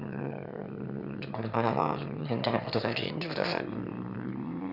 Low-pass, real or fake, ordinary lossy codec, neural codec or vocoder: 5.4 kHz; fake; none; autoencoder, 22.05 kHz, a latent of 192 numbers a frame, VITS, trained on one speaker